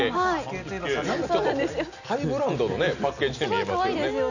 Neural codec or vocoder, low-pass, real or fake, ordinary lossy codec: none; 7.2 kHz; real; none